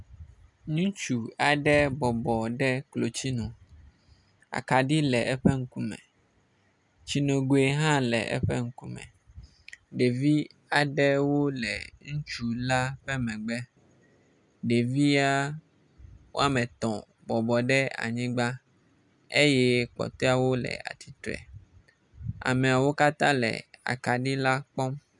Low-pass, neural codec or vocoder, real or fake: 10.8 kHz; none; real